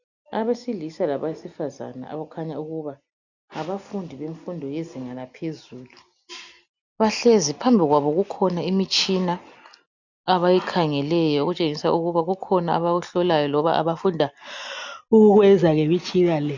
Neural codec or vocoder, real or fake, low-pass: none; real; 7.2 kHz